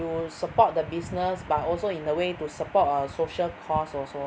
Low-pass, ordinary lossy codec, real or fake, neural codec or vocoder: none; none; real; none